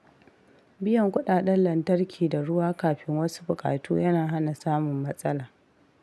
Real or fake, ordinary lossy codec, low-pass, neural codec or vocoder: real; none; none; none